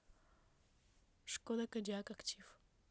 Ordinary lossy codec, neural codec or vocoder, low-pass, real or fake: none; none; none; real